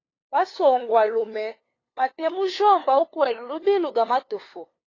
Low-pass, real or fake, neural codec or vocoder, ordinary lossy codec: 7.2 kHz; fake; codec, 16 kHz, 2 kbps, FunCodec, trained on LibriTTS, 25 frames a second; AAC, 32 kbps